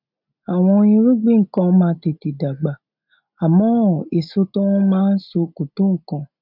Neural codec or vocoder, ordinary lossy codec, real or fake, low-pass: none; none; real; 5.4 kHz